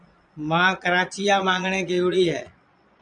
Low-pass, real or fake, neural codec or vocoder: 9.9 kHz; fake; vocoder, 22.05 kHz, 80 mel bands, Vocos